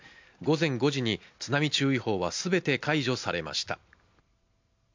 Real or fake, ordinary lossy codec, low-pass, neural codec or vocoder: real; MP3, 64 kbps; 7.2 kHz; none